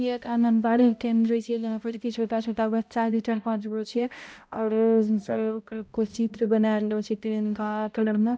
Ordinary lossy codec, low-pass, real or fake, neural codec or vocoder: none; none; fake; codec, 16 kHz, 0.5 kbps, X-Codec, HuBERT features, trained on balanced general audio